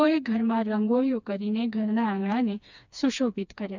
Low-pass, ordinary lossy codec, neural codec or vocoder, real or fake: 7.2 kHz; none; codec, 16 kHz, 2 kbps, FreqCodec, smaller model; fake